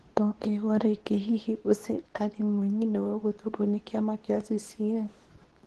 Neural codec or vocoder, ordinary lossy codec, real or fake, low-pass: codec, 24 kHz, 0.9 kbps, WavTokenizer, medium speech release version 2; Opus, 16 kbps; fake; 10.8 kHz